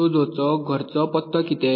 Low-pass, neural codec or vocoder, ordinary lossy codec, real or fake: 5.4 kHz; none; MP3, 24 kbps; real